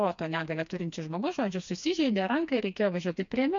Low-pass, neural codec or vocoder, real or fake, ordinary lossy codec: 7.2 kHz; codec, 16 kHz, 2 kbps, FreqCodec, smaller model; fake; MP3, 64 kbps